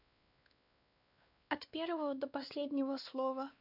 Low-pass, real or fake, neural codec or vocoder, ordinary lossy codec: 5.4 kHz; fake; codec, 16 kHz, 2 kbps, X-Codec, WavLM features, trained on Multilingual LibriSpeech; none